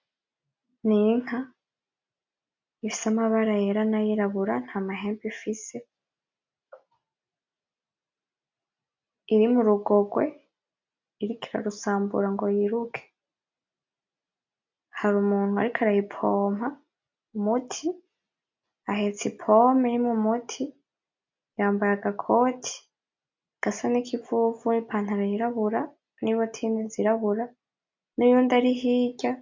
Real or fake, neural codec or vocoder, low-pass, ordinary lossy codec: real; none; 7.2 kHz; MP3, 64 kbps